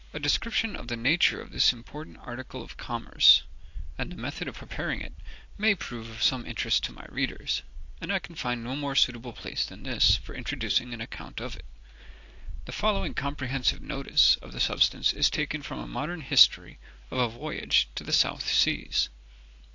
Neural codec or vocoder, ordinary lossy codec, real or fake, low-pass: none; AAC, 48 kbps; real; 7.2 kHz